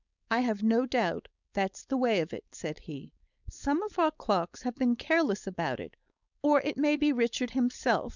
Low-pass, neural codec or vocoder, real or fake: 7.2 kHz; codec, 16 kHz, 4.8 kbps, FACodec; fake